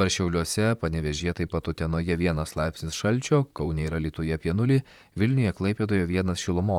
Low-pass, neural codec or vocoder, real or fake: 19.8 kHz; vocoder, 44.1 kHz, 128 mel bands, Pupu-Vocoder; fake